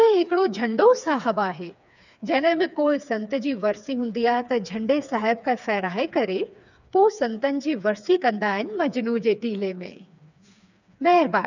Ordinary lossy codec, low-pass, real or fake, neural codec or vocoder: none; 7.2 kHz; fake; codec, 16 kHz, 4 kbps, FreqCodec, smaller model